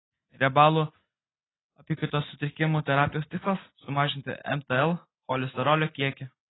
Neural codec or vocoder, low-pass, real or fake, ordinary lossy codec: none; 7.2 kHz; real; AAC, 16 kbps